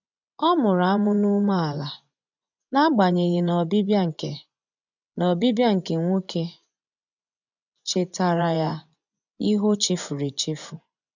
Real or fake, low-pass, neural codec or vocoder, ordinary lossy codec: fake; 7.2 kHz; vocoder, 24 kHz, 100 mel bands, Vocos; none